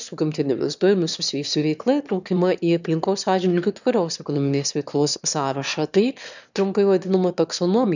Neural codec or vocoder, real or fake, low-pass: autoencoder, 22.05 kHz, a latent of 192 numbers a frame, VITS, trained on one speaker; fake; 7.2 kHz